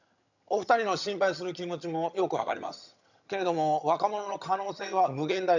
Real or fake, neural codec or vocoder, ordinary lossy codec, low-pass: fake; vocoder, 22.05 kHz, 80 mel bands, HiFi-GAN; none; 7.2 kHz